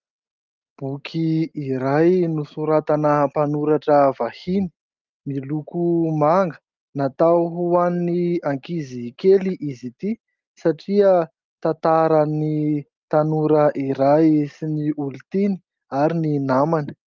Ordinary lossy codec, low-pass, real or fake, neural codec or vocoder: Opus, 32 kbps; 7.2 kHz; real; none